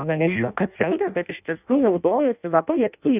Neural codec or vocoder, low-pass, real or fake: codec, 16 kHz in and 24 kHz out, 0.6 kbps, FireRedTTS-2 codec; 3.6 kHz; fake